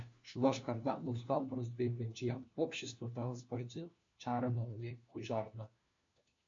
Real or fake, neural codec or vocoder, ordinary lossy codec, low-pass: fake; codec, 16 kHz, 1 kbps, FunCodec, trained on LibriTTS, 50 frames a second; MP3, 48 kbps; 7.2 kHz